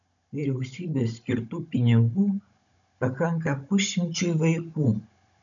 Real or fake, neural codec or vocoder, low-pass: fake; codec, 16 kHz, 16 kbps, FunCodec, trained on Chinese and English, 50 frames a second; 7.2 kHz